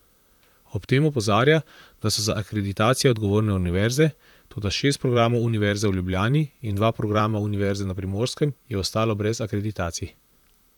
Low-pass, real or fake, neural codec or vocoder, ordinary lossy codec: 19.8 kHz; fake; vocoder, 44.1 kHz, 128 mel bands, Pupu-Vocoder; none